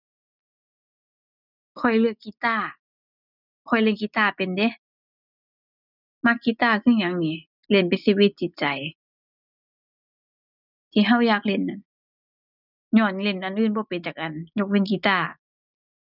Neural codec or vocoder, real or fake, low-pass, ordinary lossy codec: none; real; 5.4 kHz; none